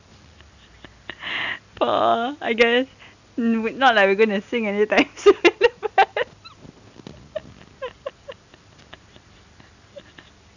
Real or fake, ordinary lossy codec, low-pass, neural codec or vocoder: real; none; 7.2 kHz; none